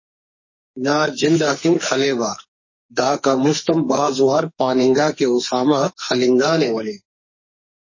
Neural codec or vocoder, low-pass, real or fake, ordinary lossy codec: codec, 44.1 kHz, 2.6 kbps, SNAC; 7.2 kHz; fake; MP3, 32 kbps